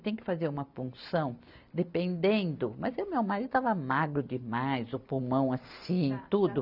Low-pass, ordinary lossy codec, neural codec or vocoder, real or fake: 5.4 kHz; none; none; real